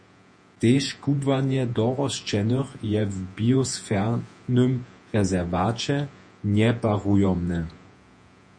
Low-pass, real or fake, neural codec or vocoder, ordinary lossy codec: 9.9 kHz; fake; vocoder, 48 kHz, 128 mel bands, Vocos; MP3, 48 kbps